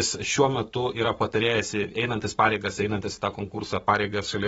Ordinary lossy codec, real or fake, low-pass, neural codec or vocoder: AAC, 24 kbps; fake; 19.8 kHz; codec, 44.1 kHz, 7.8 kbps, Pupu-Codec